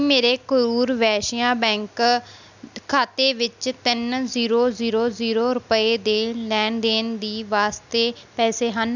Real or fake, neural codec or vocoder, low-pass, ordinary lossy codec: real; none; 7.2 kHz; none